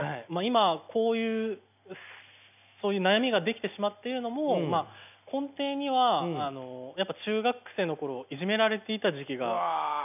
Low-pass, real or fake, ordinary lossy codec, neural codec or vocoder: 3.6 kHz; real; none; none